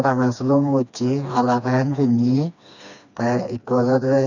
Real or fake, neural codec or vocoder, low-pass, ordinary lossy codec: fake; codec, 16 kHz, 2 kbps, FreqCodec, smaller model; 7.2 kHz; none